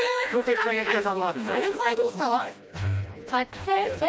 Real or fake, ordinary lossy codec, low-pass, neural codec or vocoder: fake; none; none; codec, 16 kHz, 1 kbps, FreqCodec, smaller model